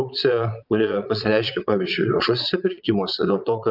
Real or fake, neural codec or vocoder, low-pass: fake; vocoder, 22.05 kHz, 80 mel bands, Vocos; 5.4 kHz